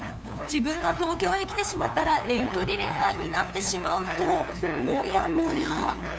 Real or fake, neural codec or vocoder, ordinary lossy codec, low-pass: fake; codec, 16 kHz, 2 kbps, FunCodec, trained on LibriTTS, 25 frames a second; none; none